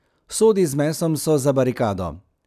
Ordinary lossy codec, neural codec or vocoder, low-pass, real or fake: none; none; 14.4 kHz; real